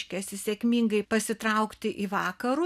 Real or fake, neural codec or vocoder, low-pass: real; none; 14.4 kHz